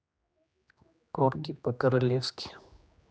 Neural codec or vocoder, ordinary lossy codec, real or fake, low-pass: codec, 16 kHz, 2 kbps, X-Codec, HuBERT features, trained on general audio; none; fake; none